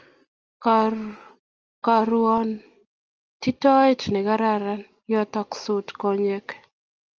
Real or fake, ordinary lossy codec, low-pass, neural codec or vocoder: real; Opus, 24 kbps; 7.2 kHz; none